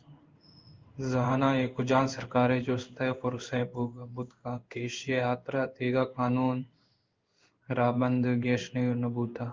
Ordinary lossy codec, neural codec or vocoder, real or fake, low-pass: Opus, 32 kbps; codec, 16 kHz in and 24 kHz out, 1 kbps, XY-Tokenizer; fake; 7.2 kHz